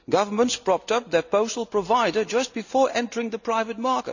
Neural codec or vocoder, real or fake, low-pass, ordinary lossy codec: none; real; 7.2 kHz; none